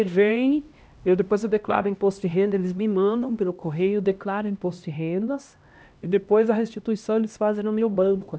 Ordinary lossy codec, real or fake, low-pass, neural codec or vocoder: none; fake; none; codec, 16 kHz, 1 kbps, X-Codec, HuBERT features, trained on LibriSpeech